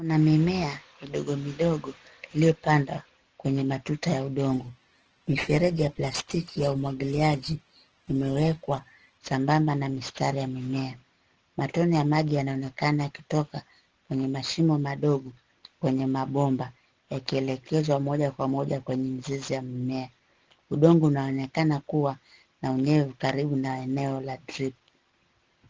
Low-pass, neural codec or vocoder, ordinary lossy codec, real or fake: 7.2 kHz; none; Opus, 16 kbps; real